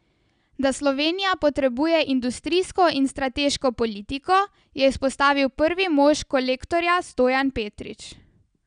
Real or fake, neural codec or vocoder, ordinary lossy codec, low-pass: real; none; none; 9.9 kHz